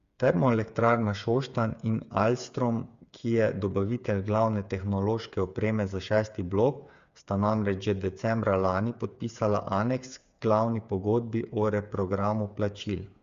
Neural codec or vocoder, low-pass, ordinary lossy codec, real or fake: codec, 16 kHz, 8 kbps, FreqCodec, smaller model; 7.2 kHz; Opus, 64 kbps; fake